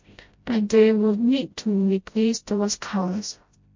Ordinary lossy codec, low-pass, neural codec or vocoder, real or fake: MP3, 48 kbps; 7.2 kHz; codec, 16 kHz, 0.5 kbps, FreqCodec, smaller model; fake